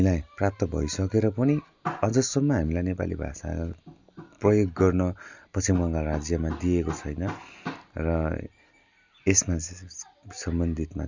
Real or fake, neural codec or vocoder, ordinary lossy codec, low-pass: real; none; Opus, 64 kbps; 7.2 kHz